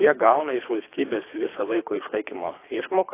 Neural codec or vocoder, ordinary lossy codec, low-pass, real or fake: codec, 24 kHz, 3 kbps, HILCodec; AAC, 24 kbps; 3.6 kHz; fake